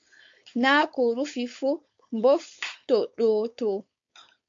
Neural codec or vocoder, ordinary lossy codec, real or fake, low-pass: codec, 16 kHz, 4.8 kbps, FACodec; MP3, 48 kbps; fake; 7.2 kHz